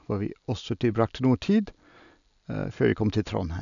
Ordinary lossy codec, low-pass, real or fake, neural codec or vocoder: none; 7.2 kHz; real; none